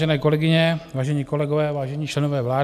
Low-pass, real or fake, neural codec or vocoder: 14.4 kHz; real; none